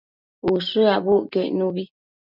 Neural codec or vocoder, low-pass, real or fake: none; 5.4 kHz; real